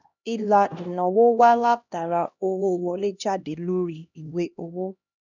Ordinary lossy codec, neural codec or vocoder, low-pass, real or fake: none; codec, 16 kHz, 1 kbps, X-Codec, HuBERT features, trained on LibriSpeech; 7.2 kHz; fake